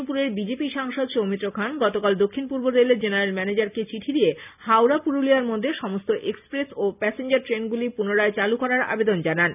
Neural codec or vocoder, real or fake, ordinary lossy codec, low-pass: none; real; none; 3.6 kHz